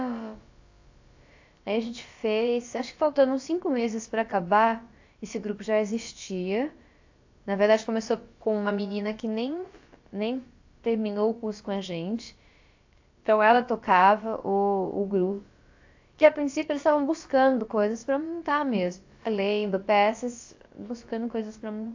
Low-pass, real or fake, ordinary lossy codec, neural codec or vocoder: 7.2 kHz; fake; AAC, 48 kbps; codec, 16 kHz, about 1 kbps, DyCAST, with the encoder's durations